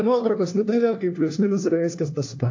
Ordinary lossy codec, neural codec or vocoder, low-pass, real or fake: AAC, 48 kbps; codec, 16 kHz, 1 kbps, FunCodec, trained on LibriTTS, 50 frames a second; 7.2 kHz; fake